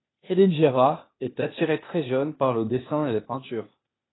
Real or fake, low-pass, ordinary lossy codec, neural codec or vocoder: fake; 7.2 kHz; AAC, 16 kbps; codec, 16 kHz, 0.8 kbps, ZipCodec